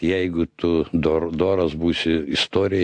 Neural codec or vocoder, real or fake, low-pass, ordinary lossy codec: none; real; 9.9 kHz; AAC, 64 kbps